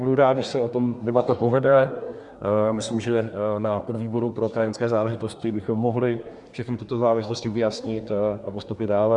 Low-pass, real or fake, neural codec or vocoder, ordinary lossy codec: 10.8 kHz; fake; codec, 24 kHz, 1 kbps, SNAC; Opus, 64 kbps